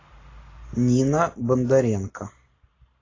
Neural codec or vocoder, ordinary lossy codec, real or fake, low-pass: none; AAC, 32 kbps; real; 7.2 kHz